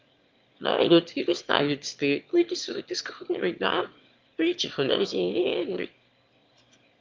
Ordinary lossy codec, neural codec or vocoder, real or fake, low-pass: Opus, 24 kbps; autoencoder, 22.05 kHz, a latent of 192 numbers a frame, VITS, trained on one speaker; fake; 7.2 kHz